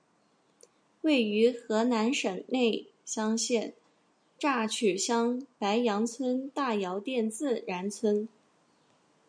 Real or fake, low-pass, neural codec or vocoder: real; 9.9 kHz; none